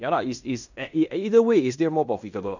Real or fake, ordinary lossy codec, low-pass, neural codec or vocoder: fake; none; 7.2 kHz; codec, 16 kHz in and 24 kHz out, 0.9 kbps, LongCat-Audio-Codec, fine tuned four codebook decoder